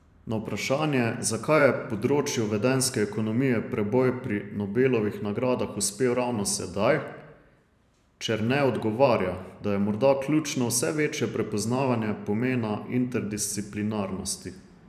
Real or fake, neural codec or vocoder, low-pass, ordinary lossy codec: fake; vocoder, 44.1 kHz, 128 mel bands every 512 samples, BigVGAN v2; 14.4 kHz; none